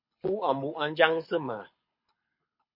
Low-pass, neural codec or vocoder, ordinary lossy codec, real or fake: 5.4 kHz; codec, 24 kHz, 6 kbps, HILCodec; MP3, 32 kbps; fake